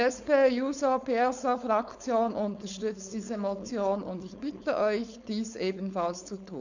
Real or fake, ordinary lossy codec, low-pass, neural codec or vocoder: fake; none; 7.2 kHz; codec, 16 kHz, 4.8 kbps, FACodec